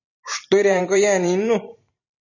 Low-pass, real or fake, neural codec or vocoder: 7.2 kHz; fake; vocoder, 44.1 kHz, 128 mel bands every 512 samples, BigVGAN v2